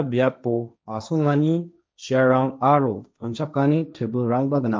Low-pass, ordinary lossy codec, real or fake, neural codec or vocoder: none; none; fake; codec, 16 kHz, 1.1 kbps, Voila-Tokenizer